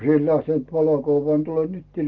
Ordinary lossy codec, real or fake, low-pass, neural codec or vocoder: Opus, 24 kbps; real; 7.2 kHz; none